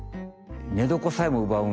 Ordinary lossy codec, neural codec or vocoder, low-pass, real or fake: none; none; none; real